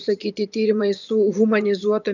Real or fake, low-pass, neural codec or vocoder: fake; 7.2 kHz; vocoder, 24 kHz, 100 mel bands, Vocos